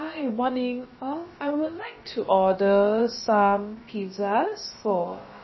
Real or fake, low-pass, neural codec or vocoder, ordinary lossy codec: fake; 7.2 kHz; codec, 16 kHz, about 1 kbps, DyCAST, with the encoder's durations; MP3, 24 kbps